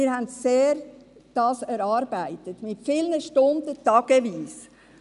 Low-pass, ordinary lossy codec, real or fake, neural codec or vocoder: 10.8 kHz; none; real; none